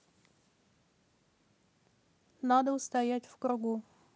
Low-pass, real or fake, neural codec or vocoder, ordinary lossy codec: none; real; none; none